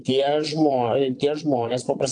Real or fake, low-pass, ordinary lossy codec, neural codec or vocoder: fake; 9.9 kHz; AAC, 48 kbps; vocoder, 22.05 kHz, 80 mel bands, Vocos